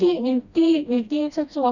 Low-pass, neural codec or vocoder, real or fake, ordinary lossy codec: 7.2 kHz; codec, 16 kHz, 1 kbps, FreqCodec, smaller model; fake; none